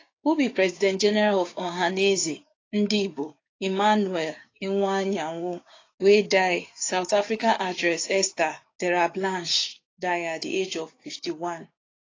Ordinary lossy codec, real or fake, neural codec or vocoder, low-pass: AAC, 32 kbps; fake; codec, 16 kHz, 6 kbps, DAC; 7.2 kHz